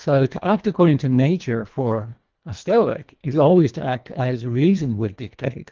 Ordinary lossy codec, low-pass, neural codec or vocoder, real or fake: Opus, 32 kbps; 7.2 kHz; codec, 24 kHz, 1.5 kbps, HILCodec; fake